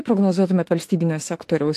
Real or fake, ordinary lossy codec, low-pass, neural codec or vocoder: fake; AAC, 64 kbps; 14.4 kHz; autoencoder, 48 kHz, 32 numbers a frame, DAC-VAE, trained on Japanese speech